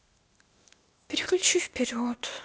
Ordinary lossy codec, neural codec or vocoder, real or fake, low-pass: none; codec, 16 kHz, 0.8 kbps, ZipCodec; fake; none